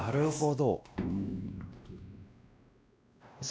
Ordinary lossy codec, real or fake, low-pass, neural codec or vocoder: none; fake; none; codec, 16 kHz, 1 kbps, X-Codec, WavLM features, trained on Multilingual LibriSpeech